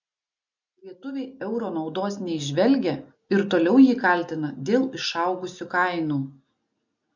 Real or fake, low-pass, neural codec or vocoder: real; 7.2 kHz; none